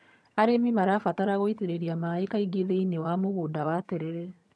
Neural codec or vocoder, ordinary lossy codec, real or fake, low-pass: vocoder, 22.05 kHz, 80 mel bands, HiFi-GAN; none; fake; none